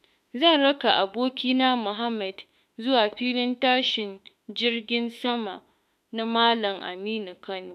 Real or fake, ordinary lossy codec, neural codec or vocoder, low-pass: fake; none; autoencoder, 48 kHz, 32 numbers a frame, DAC-VAE, trained on Japanese speech; 14.4 kHz